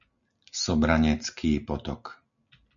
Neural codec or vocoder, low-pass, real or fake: none; 7.2 kHz; real